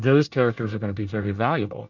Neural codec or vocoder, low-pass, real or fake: codec, 24 kHz, 1 kbps, SNAC; 7.2 kHz; fake